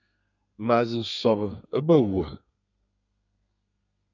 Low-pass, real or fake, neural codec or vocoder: 7.2 kHz; fake; codec, 32 kHz, 1.9 kbps, SNAC